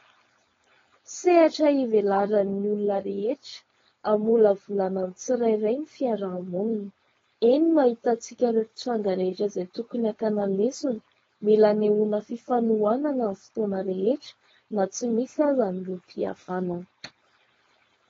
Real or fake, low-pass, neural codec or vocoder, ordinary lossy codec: fake; 7.2 kHz; codec, 16 kHz, 4.8 kbps, FACodec; AAC, 24 kbps